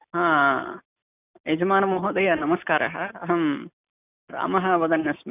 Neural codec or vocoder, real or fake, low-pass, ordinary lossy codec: none; real; 3.6 kHz; none